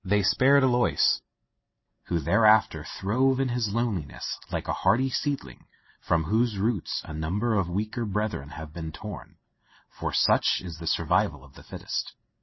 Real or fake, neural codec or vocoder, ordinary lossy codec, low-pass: real; none; MP3, 24 kbps; 7.2 kHz